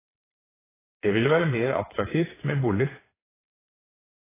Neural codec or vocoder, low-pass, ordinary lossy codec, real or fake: vocoder, 22.05 kHz, 80 mel bands, WaveNeXt; 3.6 kHz; AAC, 16 kbps; fake